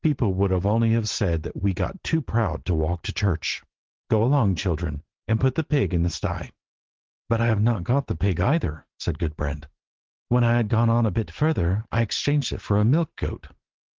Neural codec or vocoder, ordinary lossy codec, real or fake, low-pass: none; Opus, 16 kbps; real; 7.2 kHz